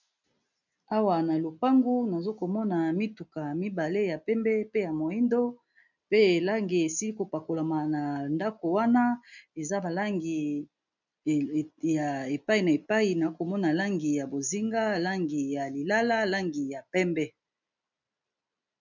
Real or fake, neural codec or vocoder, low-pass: real; none; 7.2 kHz